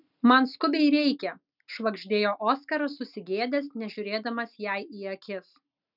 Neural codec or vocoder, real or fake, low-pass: none; real; 5.4 kHz